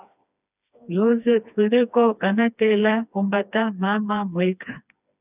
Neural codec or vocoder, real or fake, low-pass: codec, 16 kHz, 2 kbps, FreqCodec, smaller model; fake; 3.6 kHz